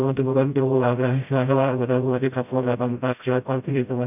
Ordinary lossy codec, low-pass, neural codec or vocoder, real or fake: none; 3.6 kHz; codec, 16 kHz, 0.5 kbps, FreqCodec, smaller model; fake